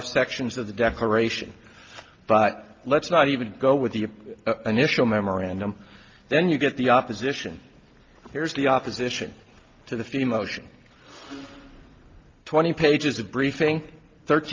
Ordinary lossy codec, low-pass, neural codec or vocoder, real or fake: Opus, 24 kbps; 7.2 kHz; none; real